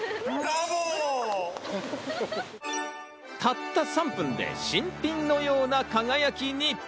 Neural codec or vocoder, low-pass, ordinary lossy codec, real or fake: none; none; none; real